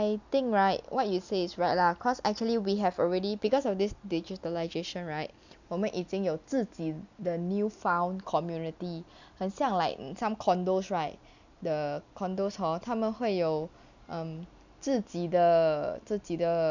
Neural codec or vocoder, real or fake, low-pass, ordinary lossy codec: none; real; 7.2 kHz; none